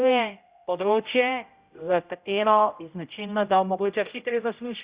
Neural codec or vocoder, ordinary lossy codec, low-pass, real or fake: codec, 16 kHz, 0.5 kbps, X-Codec, HuBERT features, trained on general audio; Opus, 64 kbps; 3.6 kHz; fake